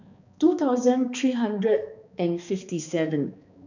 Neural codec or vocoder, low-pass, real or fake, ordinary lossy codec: codec, 16 kHz, 2 kbps, X-Codec, HuBERT features, trained on balanced general audio; 7.2 kHz; fake; none